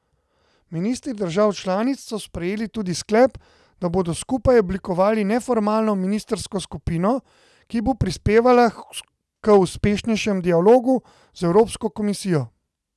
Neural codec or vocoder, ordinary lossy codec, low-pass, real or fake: none; none; none; real